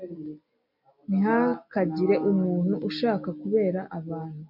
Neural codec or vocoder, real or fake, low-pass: none; real; 5.4 kHz